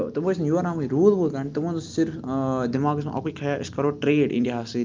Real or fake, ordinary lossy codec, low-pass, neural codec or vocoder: real; Opus, 24 kbps; 7.2 kHz; none